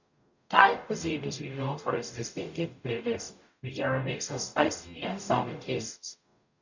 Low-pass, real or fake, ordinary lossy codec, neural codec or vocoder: 7.2 kHz; fake; none; codec, 44.1 kHz, 0.9 kbps, DAC